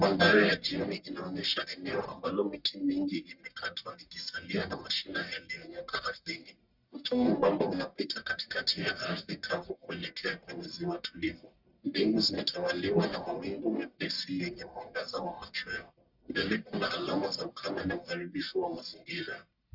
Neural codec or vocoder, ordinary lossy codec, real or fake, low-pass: codec, 44.1 kHz, 1.7 kbps, Pupu-Codec; Opus, 64 kbps; fake; 5.4 kHz